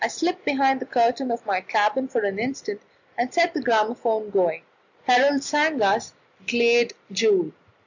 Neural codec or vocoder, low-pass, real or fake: none; 7.2 kHz; real